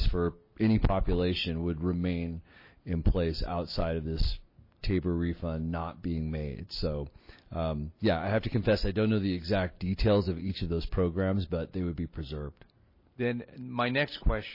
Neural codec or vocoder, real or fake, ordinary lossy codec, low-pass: none; real; MP3, 24 kbps; 5.4 kHz